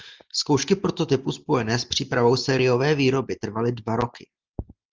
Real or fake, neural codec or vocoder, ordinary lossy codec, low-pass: real; none; Opus, 24 kbps; 7.2 kHz